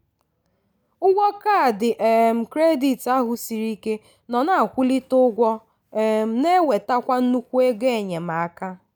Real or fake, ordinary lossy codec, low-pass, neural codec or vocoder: real; none; none; none